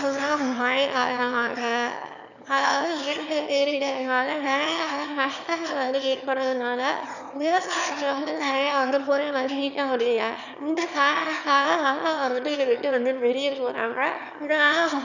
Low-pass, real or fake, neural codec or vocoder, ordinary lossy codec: 7.2 kHz; fake; autoencoder, 22.05 kHz, a latent of 192 numbers a frame, VITS, trained on one speaker; none